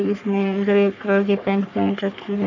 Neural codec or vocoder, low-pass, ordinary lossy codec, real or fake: codec, 44.1 kHz, 3.4 kbps, Pupu-Codec; 7.2 kHz; none; fake